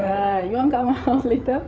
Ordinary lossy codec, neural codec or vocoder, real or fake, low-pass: none; codec, 16 kHz, 8 kbps, FreqCodec, larger model; fake; none